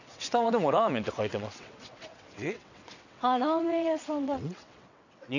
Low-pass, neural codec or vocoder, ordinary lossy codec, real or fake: 7.2 kHz; vocoder, 22.05 kHz, 80 mel bands, WaveNeXt; none; fake